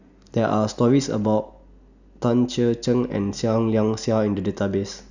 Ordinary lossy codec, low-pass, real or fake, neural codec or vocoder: none; 7.2 kHz; real; none